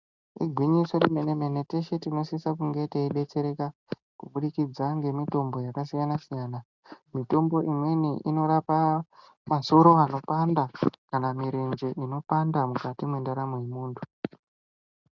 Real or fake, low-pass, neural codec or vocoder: real; 7.2 kHz; none